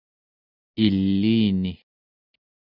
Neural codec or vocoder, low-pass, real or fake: none; 5.4 kHz; real